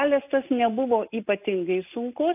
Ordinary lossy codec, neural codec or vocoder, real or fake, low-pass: AAC, 32 kbps; none; real; 3.6 kHz